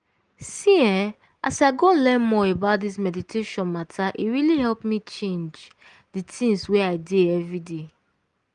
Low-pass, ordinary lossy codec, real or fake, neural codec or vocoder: 9.9 kHz; Opus, 24 kbps; real; none